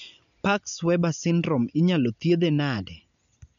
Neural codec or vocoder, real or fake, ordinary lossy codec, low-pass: none; real; none; 7.2 kHz